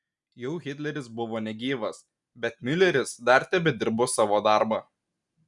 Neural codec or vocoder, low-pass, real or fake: none; 10.8 kHz; real